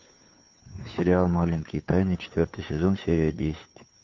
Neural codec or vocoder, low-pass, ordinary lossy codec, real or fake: codec, 16 kHz, 2 kbps, FunCodec, trained on Chinese and English, 25 frames a second; 7.2 kHz; MP3, 48 kbps; fake